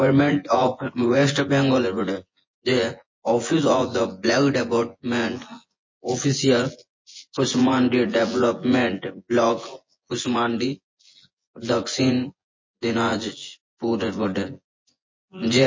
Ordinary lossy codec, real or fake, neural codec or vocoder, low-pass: MP3, 32 kbps; fake; vocoder, 24 kHz, 100 mel bands, Vocos; 7.2 kHz